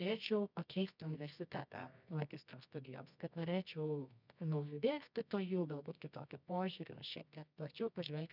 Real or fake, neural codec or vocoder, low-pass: fake; codec, 24 kHz, 0.9 kbps, WavTokenizer, medium music audio release; 5.4 kHz